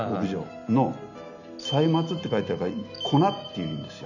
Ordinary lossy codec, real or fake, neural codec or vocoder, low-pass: none; real; none; 7.2 kHz